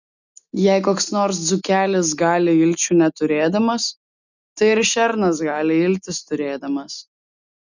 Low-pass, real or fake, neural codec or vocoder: 7.2 kHz; real; none